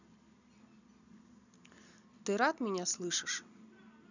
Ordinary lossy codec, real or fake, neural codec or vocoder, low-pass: none; real; none; 7.2 kHz